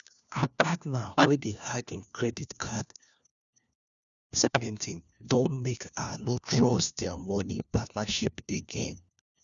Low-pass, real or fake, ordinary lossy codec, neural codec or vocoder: 7.2 kHz; fake; none; codec, 16 kHz, 1 kbps, FunCodec, trained on LibriTTS, 50 frames a second